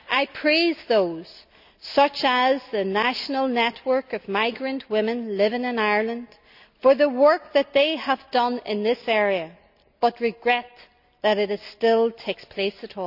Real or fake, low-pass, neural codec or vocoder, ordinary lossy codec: real; 5.4 kHz; none; none